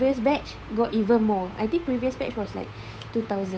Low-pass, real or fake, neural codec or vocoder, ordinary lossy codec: none; fake; codec, 16 kHz, 8 kbps, FunCodec, trained on Chinese and English, 25 frames a second; none